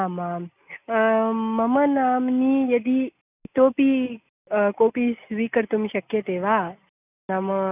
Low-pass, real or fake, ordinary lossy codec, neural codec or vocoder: 3.6 kHz; real; none; none